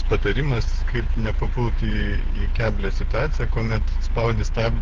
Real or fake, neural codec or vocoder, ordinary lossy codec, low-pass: fake; codec, 16 kHz, 8 kbps, FreqCodec, smaller model; Opus, 16 kbps; 7.2 kHz